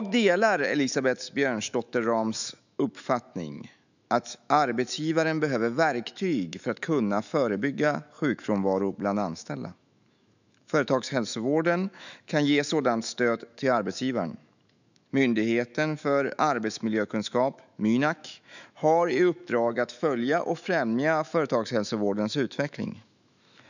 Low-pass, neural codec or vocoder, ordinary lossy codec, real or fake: 7.2 kHz; autoencoder, 48 kHz, 128 numbers a frame, DAC-VAE, trained on Japanese speech; none; fake